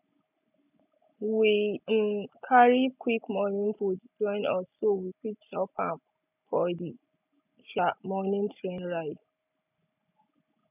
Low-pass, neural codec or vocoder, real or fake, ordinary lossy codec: 3.6 kHz; none; real; none